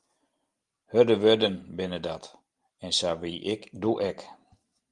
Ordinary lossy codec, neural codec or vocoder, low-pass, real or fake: Opus, 24 kbps; none; 10.8 kHz; real